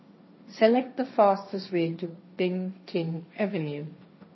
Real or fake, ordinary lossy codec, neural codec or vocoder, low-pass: fake; MP3, 24 kbps; codec, 16 kHz, 1.1 kbps, Voila-Tokenizer; 7.2 kHz